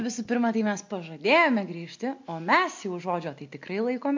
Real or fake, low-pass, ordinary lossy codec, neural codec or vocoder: real; 7.2 kHz; MP3, 48 kbps; none